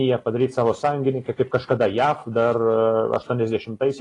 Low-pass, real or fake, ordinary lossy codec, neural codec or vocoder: 10.8 kHz; real; AAC, 32 kbps; none